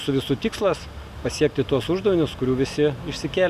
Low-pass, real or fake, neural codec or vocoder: 14.4 kHz; real; none